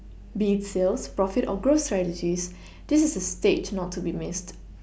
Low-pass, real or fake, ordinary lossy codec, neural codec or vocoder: none; real; none; none